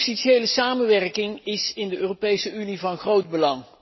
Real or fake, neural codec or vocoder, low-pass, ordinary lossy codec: real; none; 7.2 kHz; MP3, 24 kbps